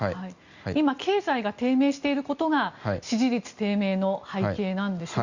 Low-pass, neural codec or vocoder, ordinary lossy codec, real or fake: 7.2 kHz; none; Opus, 64 kbps; real